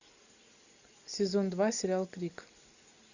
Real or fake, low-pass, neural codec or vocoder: real; 7.2 kHz; none